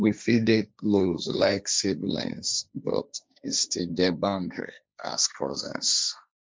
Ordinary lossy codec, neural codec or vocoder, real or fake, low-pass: none; codec, 16 kHz, 1.1 kbps, Voila-Tokenizer; fake; none